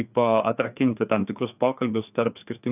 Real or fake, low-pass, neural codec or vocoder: fake; 3.6 kHz; codec, 16 kHz, 2 kbps, FreqCodec, larger model